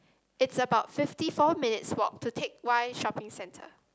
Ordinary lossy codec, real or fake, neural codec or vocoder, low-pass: none; real; none; none